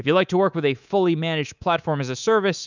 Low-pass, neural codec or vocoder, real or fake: 7.2 kHz; codec, 24 kHz, 3.1 kbps, DualCodec; fake